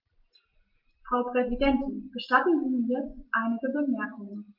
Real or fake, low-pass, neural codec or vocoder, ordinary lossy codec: real; 5.4 kHz; none; Opus, 32 kbps